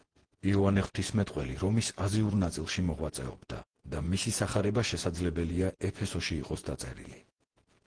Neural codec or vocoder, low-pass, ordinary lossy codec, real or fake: vocoder, 48 kHz, 128 mel bands, Vocos; 9.9 kHz; Opus, 16 kbps; fake